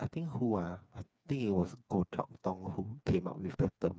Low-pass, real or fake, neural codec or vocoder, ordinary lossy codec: none; fake; codec, 16 kHz, 4 kbps, FreqCodec, smaller model; none